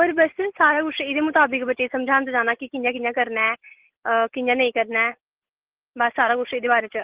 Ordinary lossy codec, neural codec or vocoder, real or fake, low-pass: Opus, 16 kbps; none; real; 3.6 kHz